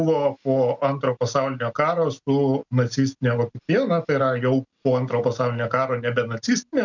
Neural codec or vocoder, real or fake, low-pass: none; real; 7.2 kHz